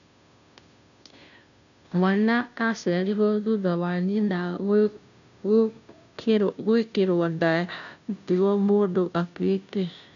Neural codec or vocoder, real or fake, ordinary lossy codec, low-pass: codec, 16 kHz, 0.5 kbps, FunCodec, trained on Chinese and English, 25 frames a second; fake; none; 7.2 kHz